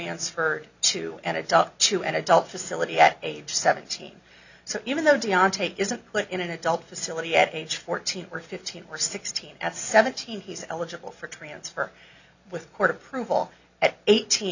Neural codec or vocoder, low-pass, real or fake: none; 7.2 kHz; real